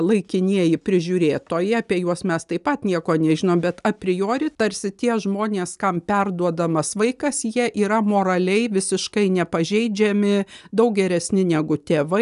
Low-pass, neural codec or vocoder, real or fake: 10.8 kHz; none; real